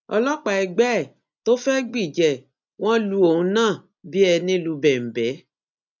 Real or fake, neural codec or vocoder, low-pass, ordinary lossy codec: real; none; 7.2 kHz; none